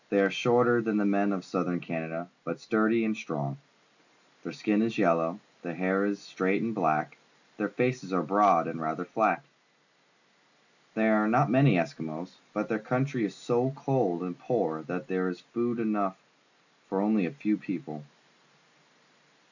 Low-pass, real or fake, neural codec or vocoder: 7.2 kHz; real; none